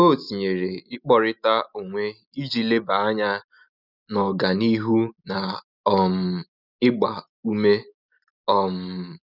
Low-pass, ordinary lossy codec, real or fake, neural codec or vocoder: 5.4 kHz; none; real; none